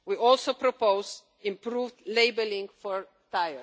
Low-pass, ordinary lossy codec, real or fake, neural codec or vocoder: none; none; real; none